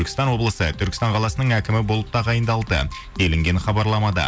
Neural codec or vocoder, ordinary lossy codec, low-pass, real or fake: none; none; none; real